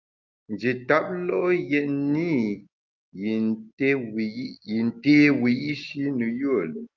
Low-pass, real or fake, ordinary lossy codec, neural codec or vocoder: 7.2 kHz; real; Opus, 24 kbps; none